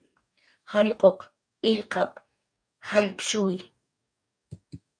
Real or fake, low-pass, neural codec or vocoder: fake; 9.9 kHz; codec, 44.1 kHz, 2.6 kbps, DAC